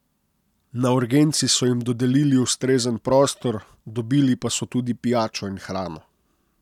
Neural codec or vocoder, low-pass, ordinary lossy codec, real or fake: none; 19.8 kHz; none; real